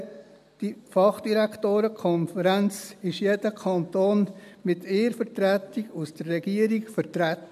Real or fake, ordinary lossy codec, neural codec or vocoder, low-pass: real; none; none; 14.4 kHz